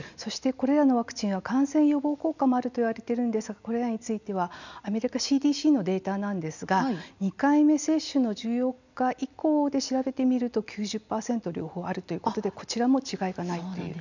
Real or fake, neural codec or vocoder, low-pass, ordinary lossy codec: real; none; 7.2 kHz; none